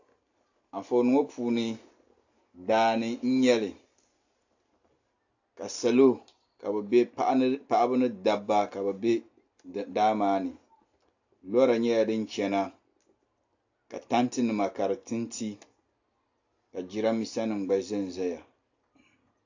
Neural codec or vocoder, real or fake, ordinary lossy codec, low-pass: none; real; AAC, 48 kbps; 7.2 kHz